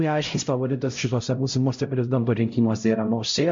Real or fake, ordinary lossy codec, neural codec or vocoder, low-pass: fake; MP3, 48 kbps; codec, 16 kHz, 0.5 kbps, X-Codec, HuBERT features, trained on LibriSpeech; 7.2 kHz